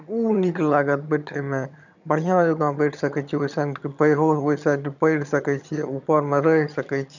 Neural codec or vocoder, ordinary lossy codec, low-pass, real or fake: vocoder, 22.05 kHz, 80 mel bands, HiFi-GAN; none; 7.2 kHz; fake